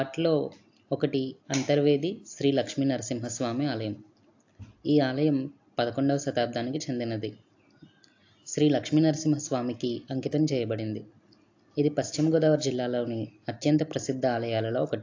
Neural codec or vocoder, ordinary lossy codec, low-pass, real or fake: none; AAC, 48 kbps; 7.2 kHz; real